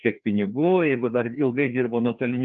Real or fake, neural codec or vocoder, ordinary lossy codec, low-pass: fake; codec, 16 kHz, 2 kbps, FreqCodec, larger model; Opus, 24 kbps; 7.2 kHz